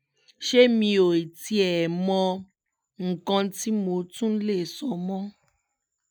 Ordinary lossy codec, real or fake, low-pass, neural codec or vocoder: none; real; none; none